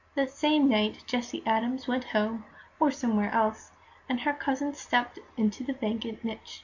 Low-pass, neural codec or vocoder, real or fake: 7.2 kHz; none; real